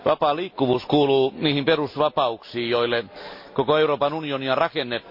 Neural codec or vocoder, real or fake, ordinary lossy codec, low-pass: none; real; MP3, 32 kbps; 5.4 kHz